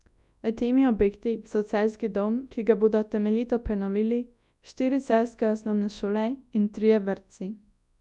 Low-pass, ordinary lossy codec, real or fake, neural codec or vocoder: 10.8 kHz; none; fake; codec, 24 kHz, 0.9 kbps, WavTokenizer, large speech release